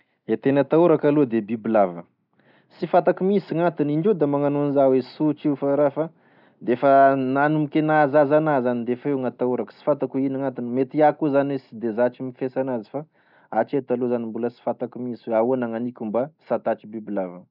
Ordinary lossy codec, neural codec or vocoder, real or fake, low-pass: none; none; real; 5.4 kHz